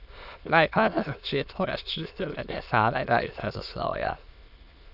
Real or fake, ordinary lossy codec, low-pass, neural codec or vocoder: fake; none; 5.4 kHz; autoencoder, 22.05 kHz, a latent of 192 numbers a frame, VITS, trained on many speakers